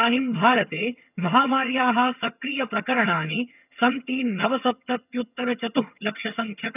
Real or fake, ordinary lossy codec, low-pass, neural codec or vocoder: fake; none; 3.6 kHz; vocoder, 22.05 kHz, 80 mel bands, HiFi-GAN